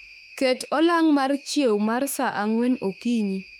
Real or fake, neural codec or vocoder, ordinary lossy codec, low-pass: fake; autoencoder, 48 kHz, 32 numbers a frame, DAC-VAE, trained on Japanese speech; none; 19.8 kHz